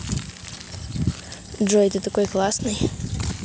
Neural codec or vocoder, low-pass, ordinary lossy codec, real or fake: none; none; none; real